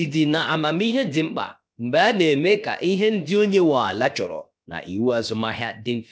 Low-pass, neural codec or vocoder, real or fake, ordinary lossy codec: none; codec, 16 kHz, about 1 kbps, DyCAST, with the encoder's durations; fake; none